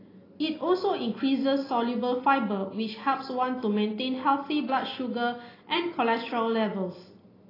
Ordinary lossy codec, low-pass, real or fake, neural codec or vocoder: AAC, 24 kbps; 5.4 kHz; real; none